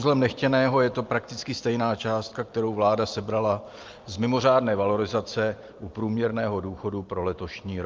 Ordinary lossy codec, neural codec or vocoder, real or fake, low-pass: Opus, 24 kbps; none; real; 7.2 kHz